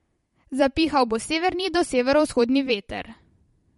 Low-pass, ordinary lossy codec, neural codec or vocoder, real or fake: 19.8 kHz; MP3, 48 kbps; vocoder, 44.1 kHz, 128 mel bands every 512 samples, BigVGAN v2; fake